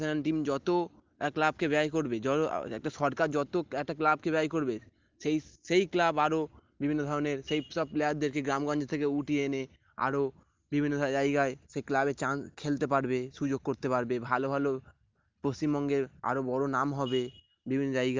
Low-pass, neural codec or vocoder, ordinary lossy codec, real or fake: 7.2 kHz; none; Opus, 24 kbps; real